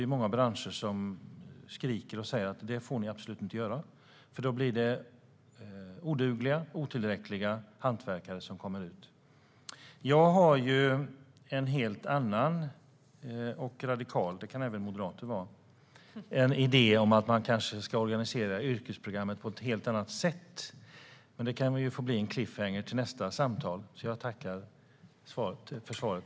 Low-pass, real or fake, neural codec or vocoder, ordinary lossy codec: none; real; none; none